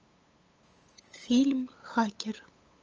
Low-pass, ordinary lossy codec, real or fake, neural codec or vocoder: 7.2 kHz; Opus, 24 kbps; fake; codec, 16 kHz, 8 kbps, FunCodec, trained on LibriTTS, 25 frames a second